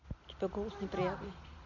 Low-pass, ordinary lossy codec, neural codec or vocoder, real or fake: 7.2 kHz; none; none; real